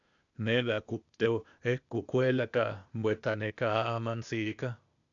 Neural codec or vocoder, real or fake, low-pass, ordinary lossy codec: codec, 16 kHz, 0.8 kbps, ZipCodec; fake; 7.2 kHz; MP3, 96 kbps